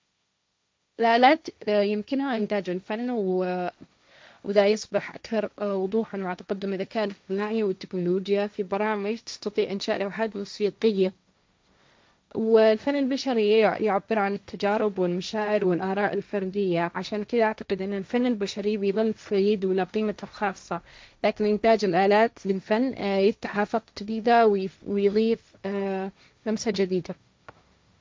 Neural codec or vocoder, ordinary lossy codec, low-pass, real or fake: codec, 16 kHz, 1.1 kbps, Voila-Tokenizer; none; none; fake